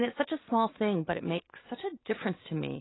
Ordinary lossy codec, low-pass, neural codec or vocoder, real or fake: AAC, 16 kbps; 7.2 kHz; none; real